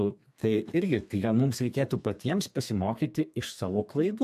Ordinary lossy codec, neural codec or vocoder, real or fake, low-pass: AAC, 96 kbps; codec, 32 kHz, 1.9 kbps, SNAC; fake; 14.4 kHz